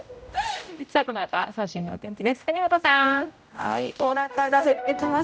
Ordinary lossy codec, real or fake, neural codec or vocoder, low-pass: none; fake; codec, 16 kHz, 0.5 kbps, X-Codec, HuBERT features, trained on general audio; none